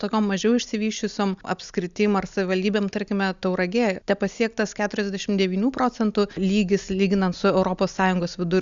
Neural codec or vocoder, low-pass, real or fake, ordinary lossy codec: none; 7.2 kHz; real; Opus, 64 kbps